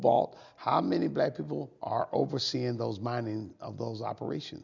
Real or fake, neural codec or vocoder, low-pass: real; none; 7.2 kHz